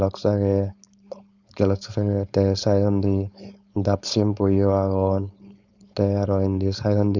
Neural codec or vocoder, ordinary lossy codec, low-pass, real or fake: codec, 16 kHz, 4.8 kbps, FACodec; Opus, 64 kbps; 7.2 kHz; fake